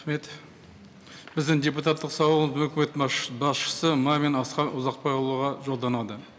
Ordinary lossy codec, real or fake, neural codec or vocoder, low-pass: none; real; none; none